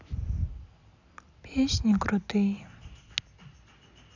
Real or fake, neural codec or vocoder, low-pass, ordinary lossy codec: real; none; 7.2 kHz; none